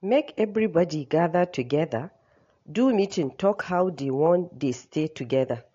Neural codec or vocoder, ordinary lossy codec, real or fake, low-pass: none; AAC, 48 kbps; real; 7.2 kHz